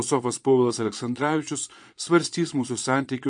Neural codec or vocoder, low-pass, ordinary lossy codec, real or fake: vocoder, 22.05 kHz, 80 mel bands, Vocos; 9.9 kHz; MP3, 48 kbps; fake